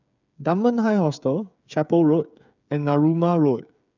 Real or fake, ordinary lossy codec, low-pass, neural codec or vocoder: fake; none; 7.2 kHz; codec, 16 kHz, 8 kbps, FreqCodec, smaller model